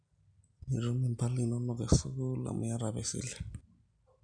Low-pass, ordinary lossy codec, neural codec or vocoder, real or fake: 9.9 kHz; none; none; real